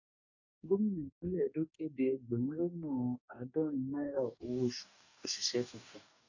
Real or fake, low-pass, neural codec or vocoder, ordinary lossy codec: fake; 7.2 kHz; codec, 44.1 kHz, 2.6 kbps, DAC; none